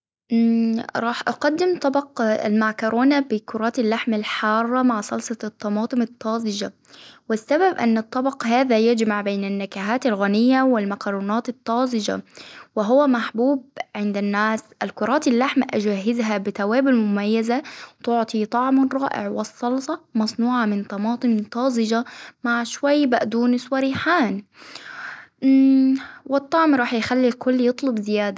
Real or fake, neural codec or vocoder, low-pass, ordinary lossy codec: real; none; none; none